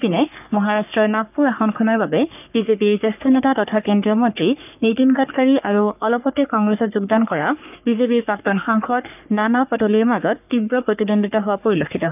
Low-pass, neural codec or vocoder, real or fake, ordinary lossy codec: 3.6 kHz; codec, 44.1 kHz, 3.4 kbps, Pupu-Codec; fake; none